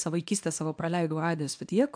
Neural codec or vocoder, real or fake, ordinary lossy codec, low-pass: codec, 24 kHz, 0.9 kbps, WavTokenizer, small release; fake; AAC, 64 kbps; 9.9 kHz